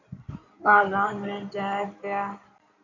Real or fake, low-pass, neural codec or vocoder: fake; 7.2 kHz; codec, 16 kHz in and 24 kHz out, 2.2 kbps, FireRedTTS-2 codec